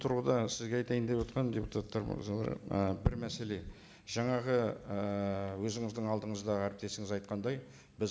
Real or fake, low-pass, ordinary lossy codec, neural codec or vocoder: real; none; none; none